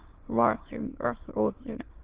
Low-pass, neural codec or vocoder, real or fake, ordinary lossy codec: 3.6 kHz; autoencoder, 22.05 kHz, a latent of 192 numbers a frame, VITS, trained on many speakers; fake; Opus, 16 kbps